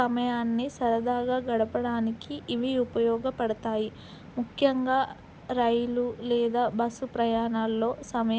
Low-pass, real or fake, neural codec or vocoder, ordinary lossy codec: none; real; none; none